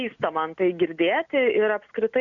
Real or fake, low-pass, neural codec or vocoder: real; 7.2 kHz; none